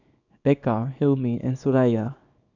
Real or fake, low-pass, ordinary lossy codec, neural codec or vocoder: fake; 7.2 kHz; none; codec, 24 kHz, 0.9 kbps, WavTokenizer, small release